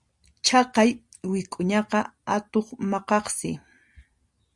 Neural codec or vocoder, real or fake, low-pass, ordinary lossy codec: none; real; 10.8 kHz; Opus, 64 kbps